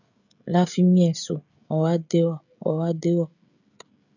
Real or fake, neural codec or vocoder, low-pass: fake; codec, 16 kHz, 16 kbps, FreqCodec, smaller model; 7.2 kHz